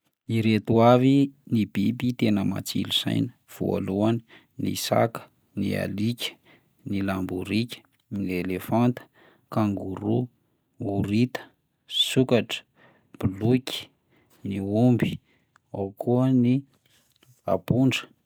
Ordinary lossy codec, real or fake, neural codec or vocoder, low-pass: none; real; none; none